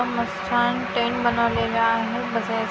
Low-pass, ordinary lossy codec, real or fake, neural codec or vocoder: none; none; real; none